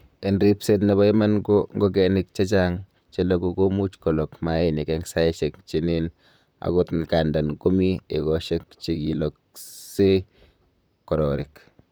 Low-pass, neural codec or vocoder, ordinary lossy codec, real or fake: none; vocoder, 44.1 kHz, 128 mel bands, Pupu-Vocoder; none; fake